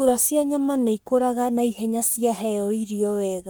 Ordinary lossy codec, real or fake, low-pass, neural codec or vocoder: none; fake; none; codec, 44.1 kHz, 3.4 kbps, Pupu-Codec